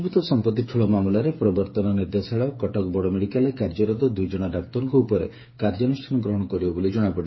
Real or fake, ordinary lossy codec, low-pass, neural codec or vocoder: fake; MP3, 24 kbps; 7.2 kHz; codec, 16 kHz, 8 kbps, FreqCodec, smaller model